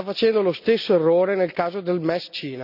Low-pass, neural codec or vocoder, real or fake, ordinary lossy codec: 5.4 kHz; none; real; none